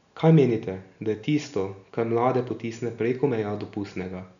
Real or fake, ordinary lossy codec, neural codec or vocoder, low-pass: real; none; none; 7.2 kHz